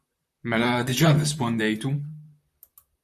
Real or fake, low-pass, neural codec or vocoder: fake; 14.4 kHz; vocoder, 44.1 kHz, 128 mel bands, Pupu-Vocoder